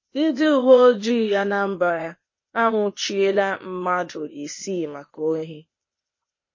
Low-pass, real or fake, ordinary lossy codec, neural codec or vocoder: 7.2 kHz; fake; MP3, 32 kbps; codec, 16 kHz, 0.8 kbps, ZipCodec